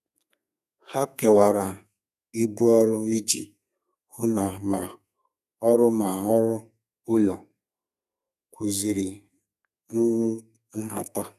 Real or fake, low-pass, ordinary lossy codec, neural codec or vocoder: fake; 14.4 kHz; none; codec, 32 kHz, 1.9 kbps, SNAC